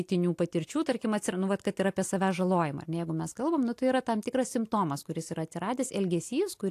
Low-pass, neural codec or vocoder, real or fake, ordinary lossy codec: 14.4 kHz; none; real; AAC, 64 kbps